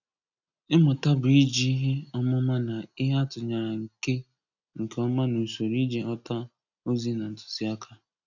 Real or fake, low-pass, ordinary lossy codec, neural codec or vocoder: real; 7.2 kHz; none; none